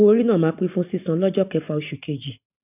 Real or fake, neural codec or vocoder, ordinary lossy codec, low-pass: real; none; none; 3.6 kHz